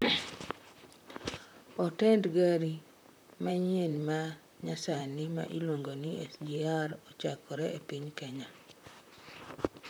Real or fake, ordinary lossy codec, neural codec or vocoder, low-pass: fake; none; vocoder, 44.1 kHz, 128 mel bands, Pupu-Vocoder; none